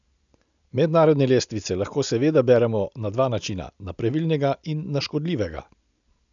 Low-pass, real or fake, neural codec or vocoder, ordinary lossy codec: 7.2 kHz; real; none; none